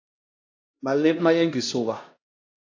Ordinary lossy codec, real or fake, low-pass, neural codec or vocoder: AAC, 48 kbps; fake; 7.2 kHz; codec, 16 kHz, 1 kbps, X-Codec, HuBERT features, trained on LibriSpeech